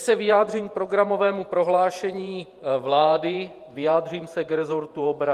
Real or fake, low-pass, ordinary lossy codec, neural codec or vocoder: fake; 14.4 kHz; Opus, 32 kbps; vocoder, 48 kHz, 128 mel bands, Vocos